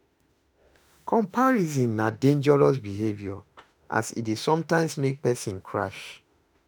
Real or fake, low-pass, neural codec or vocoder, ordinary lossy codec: fake; none; autoencoder, 48 kHz, 32 numbers a frame, DAC-VAE, trained on Japanese speech; none